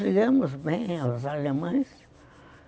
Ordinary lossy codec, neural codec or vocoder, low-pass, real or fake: none; none; none; real